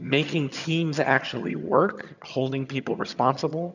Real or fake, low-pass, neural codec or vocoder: fake; 7.2 kHz; vocoder, 22.05 kHz, 80 mel bands, HiFi-GAN